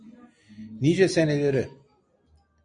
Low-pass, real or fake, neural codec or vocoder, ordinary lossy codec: 9.9 kHz; real; none; MP3, 96 kbps